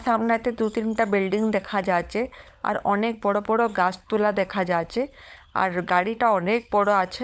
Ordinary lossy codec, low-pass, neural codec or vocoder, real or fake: none; none; codec, 16 kHz, 16 kbps, FunCodec, trained on LibriTTS, 50 frames a second; fake